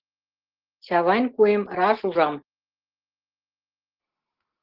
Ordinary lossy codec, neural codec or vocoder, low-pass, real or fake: Opus, 16 kbps; none; 5.4 kHz; real